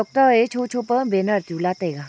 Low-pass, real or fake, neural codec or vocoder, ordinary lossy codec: none; real; none; none